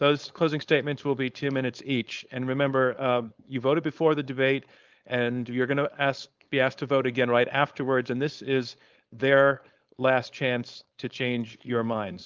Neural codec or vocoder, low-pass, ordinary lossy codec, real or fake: codec, 16 kHz, 4.8 kbps, FACodec; 7.2 kHz; Opus, 24 kbps; fake